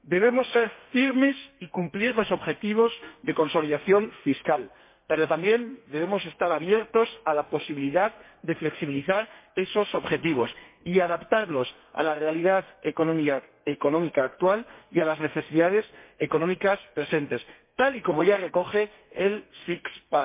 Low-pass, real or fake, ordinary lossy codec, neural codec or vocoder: 3.6 kHz; fake; MP3, 24 kbps; codec, 32 kHz, 1.9 kbps, SNAC